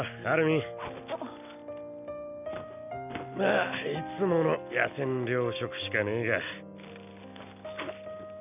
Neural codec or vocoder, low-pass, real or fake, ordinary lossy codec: none; 3.6 kHz; real; none